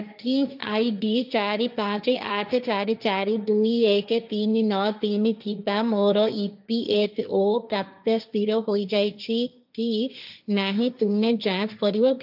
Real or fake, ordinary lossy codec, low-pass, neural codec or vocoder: fake; AAC, 48 kbps; 5.4 kHz; codec, 16 kHz, 1.1 kbps, Voila-Tokenizer